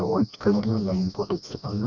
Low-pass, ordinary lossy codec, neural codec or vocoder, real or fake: 7.2 kHz; none; codec, 16 kHz, 1 kbps, FreqCodec, smaller model; fake